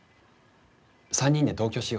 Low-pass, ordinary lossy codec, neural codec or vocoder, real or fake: none; none; none; real